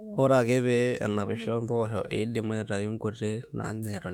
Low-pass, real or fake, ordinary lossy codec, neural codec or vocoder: 19.8 kHz; fake; none; autoencoder, 48 kHz, 32 numbers a frame, DAC-VAE, trained on Japanese speech